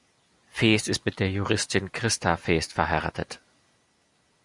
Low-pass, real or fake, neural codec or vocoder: 10.8 kHz; real; none